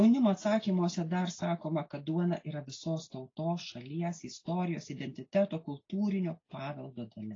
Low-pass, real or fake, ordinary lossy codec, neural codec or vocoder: 7.2 kHz; real; AAC, 32 kbps; none